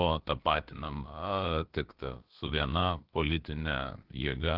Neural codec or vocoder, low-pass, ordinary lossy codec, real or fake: codec, 16 kHz, about 1 kbps, DyCAST, with the encoder's durations; 5.4 kHz; Opus, 16 kbps; fake